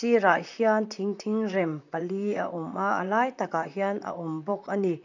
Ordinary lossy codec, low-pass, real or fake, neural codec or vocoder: none; 7.2 kHz; fake; vocoder, 44.1 kHz, 128 mel bands, Pupu-Vocoder